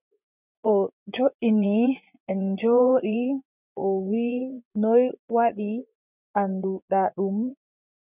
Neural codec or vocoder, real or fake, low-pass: vocoder, 24 kHz, 100 mel bands, Vocos; fake; 3.6 kHz